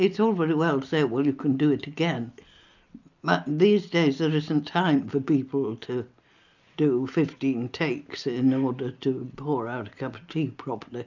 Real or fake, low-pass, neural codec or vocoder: real; 7.2 kHz; none